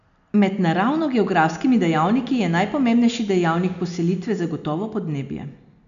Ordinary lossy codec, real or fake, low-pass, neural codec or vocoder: none; real; 7.2 kHz; none